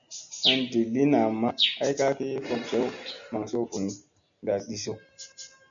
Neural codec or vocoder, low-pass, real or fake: none; 7.2 kHz; real